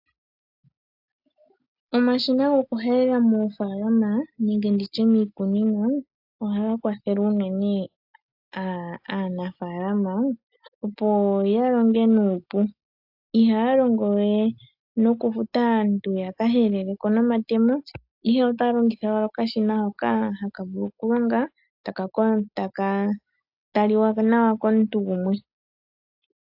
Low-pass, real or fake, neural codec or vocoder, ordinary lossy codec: 5.4 kHz; real; none; AAC, 48 kbps